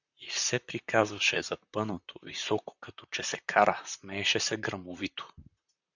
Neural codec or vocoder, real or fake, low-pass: vocoder, 44.1 kHz, 128 mel bands, Pupu-Vocoder; fake; 7.2 kHz